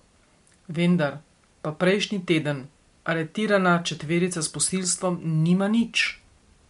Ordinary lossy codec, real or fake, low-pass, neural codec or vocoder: MP3, 64 kbps; real; 10.8 kHz; none